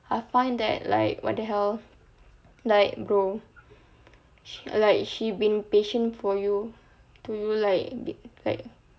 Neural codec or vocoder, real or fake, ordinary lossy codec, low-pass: none; real; none; none